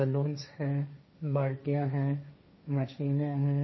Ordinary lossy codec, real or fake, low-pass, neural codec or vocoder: MP3, 24 kbps; fake; 7.2 kHz; codec, 16 kHz, 1.1 kbps, Voila-Tokenizer